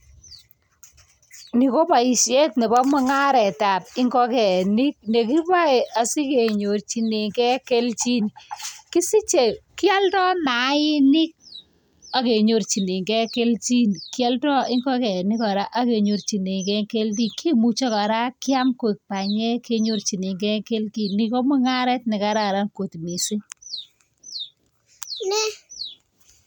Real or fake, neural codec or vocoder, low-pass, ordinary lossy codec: real; none; 19.8 kHz; none